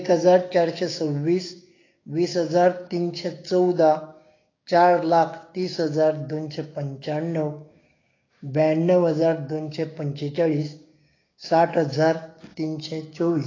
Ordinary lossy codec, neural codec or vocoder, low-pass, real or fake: AAC, 32 kbps; codec, 16 kHz, 6 kbps, DAC; 7.2 kHz; fake